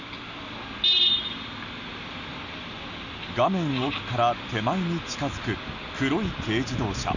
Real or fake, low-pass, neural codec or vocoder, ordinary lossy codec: real; 7.2 kHz; none; none